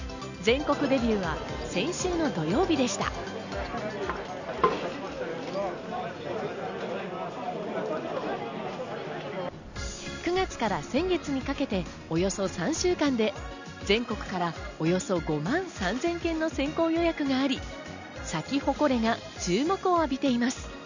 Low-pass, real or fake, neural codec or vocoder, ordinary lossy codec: 7.2 kHz; real; none; none